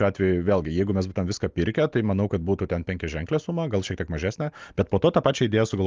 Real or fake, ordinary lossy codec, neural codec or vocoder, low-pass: real; Opus, 32 kbps; none; 7.2 kHz